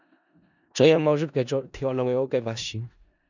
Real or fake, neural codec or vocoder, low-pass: fake; codec, 16 kHz in and 24 kHz out, 0.4 kbps, LongCat-Audio-Codec, four codebook decoder; 7.2 kHz